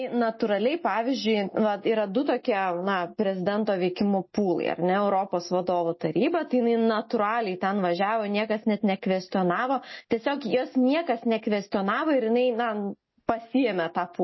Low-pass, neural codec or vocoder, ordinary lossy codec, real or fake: 7.2 kHz; none; MP3, 24 kbps; real